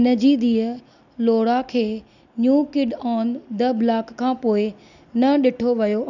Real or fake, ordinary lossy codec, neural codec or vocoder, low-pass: real; none; none; 7.2 kHz